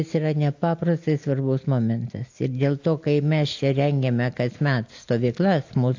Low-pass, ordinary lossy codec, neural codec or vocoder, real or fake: 7.2 kHz; AAC, 48 kbps; none; real